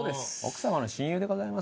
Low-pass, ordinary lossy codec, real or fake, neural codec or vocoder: none; none; real; none